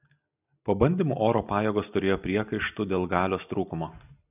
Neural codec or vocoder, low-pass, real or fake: none; 3.6 kHz; real